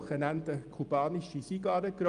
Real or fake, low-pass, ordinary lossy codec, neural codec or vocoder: real; 9.9 kHz; Opus, 32 kbps; none